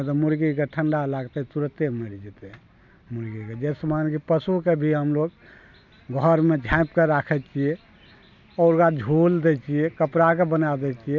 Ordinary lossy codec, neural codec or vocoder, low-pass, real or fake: none; none; 7.2 kHz; real